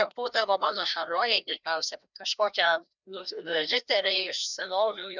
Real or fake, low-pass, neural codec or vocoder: fake; 7.2 kHz; codec, 16 kHz, 1 kbps, FreqCodec, larger model